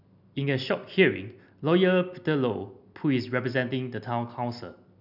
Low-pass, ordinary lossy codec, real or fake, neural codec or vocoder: 5.4 kHz; none; real; none